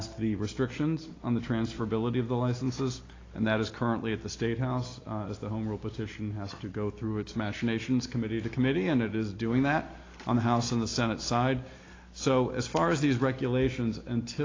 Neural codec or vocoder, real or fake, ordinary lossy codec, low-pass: none; real; AAC, 32 kbps; 7.2 kHz